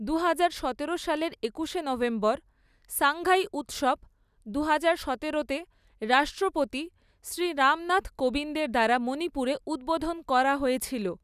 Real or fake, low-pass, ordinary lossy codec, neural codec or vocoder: real; 14.4 kHz; none; none